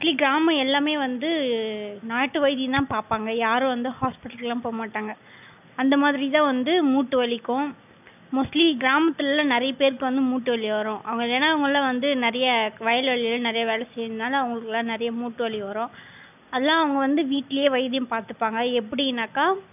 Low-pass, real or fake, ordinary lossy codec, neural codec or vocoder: 3.6 kHz; real; none; none